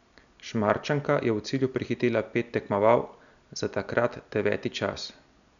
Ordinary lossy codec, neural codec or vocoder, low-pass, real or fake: none; none; 7.2 kHz; real